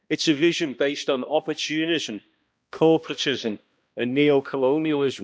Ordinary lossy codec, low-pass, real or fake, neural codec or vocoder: none; none; fake; codec, 16 kHz, 1 kbps, X-Codec, HuBERT features, trained on balanced general audio